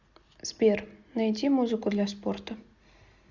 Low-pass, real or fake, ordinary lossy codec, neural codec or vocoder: 7.2 kHz; real; Opus, 64 kbps; none